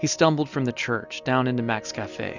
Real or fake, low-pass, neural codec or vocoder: real; 7.2 kHz; none